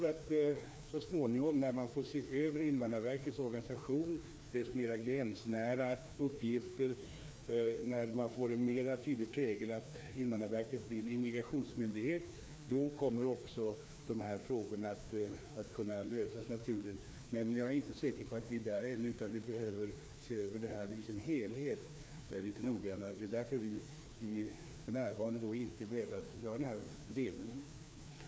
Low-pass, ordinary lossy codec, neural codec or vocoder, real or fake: none; none; codec, 16 kHz, 2 kbps, FreqCodec, larger model; fake